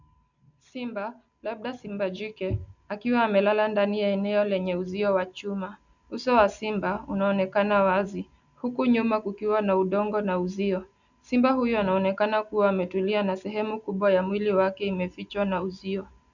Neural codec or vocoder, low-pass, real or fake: none; 7.2 kHz; real